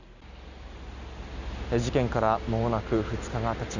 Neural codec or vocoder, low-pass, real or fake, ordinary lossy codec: none; 7.2 kHz; real; none